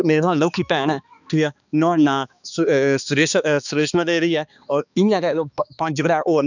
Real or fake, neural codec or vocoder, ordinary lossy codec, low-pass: fake; codec, 16 kHz, 2 kbps, X-Codec, HuBERT features, trained on balanced general audio; none; 7.2 kHz